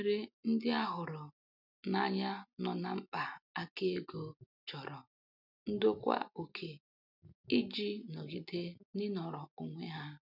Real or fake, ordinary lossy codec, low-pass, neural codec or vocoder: real; none; 5.4 kHz; none